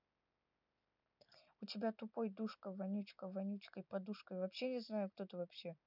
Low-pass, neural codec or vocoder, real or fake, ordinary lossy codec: 5.4 kHz; none; real; none